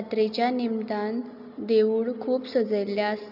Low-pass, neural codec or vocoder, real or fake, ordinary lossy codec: 5.4 kHz; none; real; AAC, 48 kbps